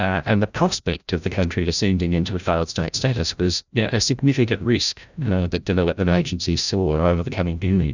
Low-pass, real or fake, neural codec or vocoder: 7.2 kHz; fake; codec, 16 kHz, 0.5 kbps, FreqCodec, larger model